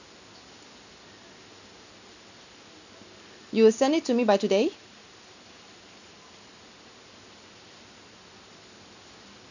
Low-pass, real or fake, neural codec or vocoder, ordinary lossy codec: 7.2 kHz; real; none; none